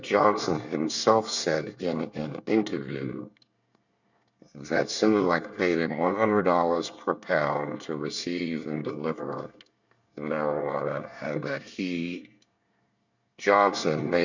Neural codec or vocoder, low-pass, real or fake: codec, 24 kHz, 1 kbps, SNAC; 7.2 kHz; fake